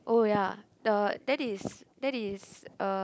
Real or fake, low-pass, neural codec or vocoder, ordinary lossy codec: real; none; none; none